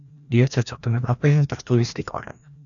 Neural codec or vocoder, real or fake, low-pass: codec, 16 kHz, 1 kbps, FreqCodec, larger model; fake; 7.2 kHz